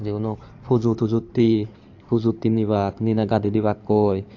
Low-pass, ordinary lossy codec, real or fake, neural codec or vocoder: 7.2 kHz; AAC, 48 kbps; fake; codec, 16 kHz in and 24 kHz out, 2.2 kbps, FireRedTTS-2 codec